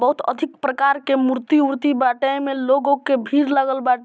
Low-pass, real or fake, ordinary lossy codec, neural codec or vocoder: none; real; none; none